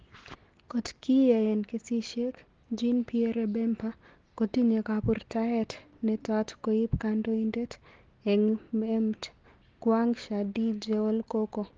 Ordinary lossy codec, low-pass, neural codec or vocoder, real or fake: Opus, 16 kbps; 7.2 kHz; none; real